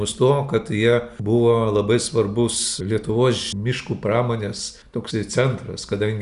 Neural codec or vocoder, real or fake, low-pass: none; real; 10.8 kHz